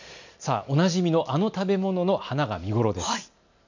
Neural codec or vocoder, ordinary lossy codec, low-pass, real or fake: none; none; 7.2 kHz; real